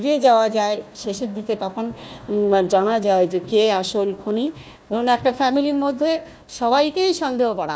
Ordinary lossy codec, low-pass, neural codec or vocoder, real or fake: none; none; codec, 16 kHz, 1 kbps, FunCodec, trained on Chinese and English, 50 frames a second; fake